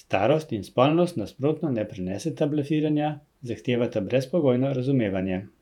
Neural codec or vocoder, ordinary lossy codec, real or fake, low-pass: vocoder, 48 kHz, 128 mel bands, Vocos; none; fake; 19.8 kHz